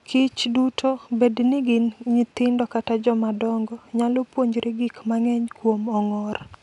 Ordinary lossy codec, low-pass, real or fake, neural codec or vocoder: none; 10.8 kHz; real; none